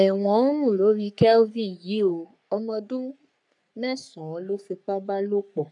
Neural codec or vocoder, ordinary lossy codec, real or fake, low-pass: codec, 44.1 kHz, 3.4 kbps, Pupu-Codec; none; fake; 10.8 kHz